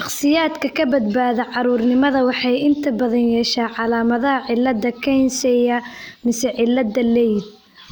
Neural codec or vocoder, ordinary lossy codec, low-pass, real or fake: none; none; none; real